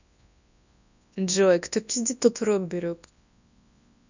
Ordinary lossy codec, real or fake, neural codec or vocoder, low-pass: none; fake; codec, 24 kHz, 0.9 kbps, WavTokenizer, large speech release; 7.2 kHz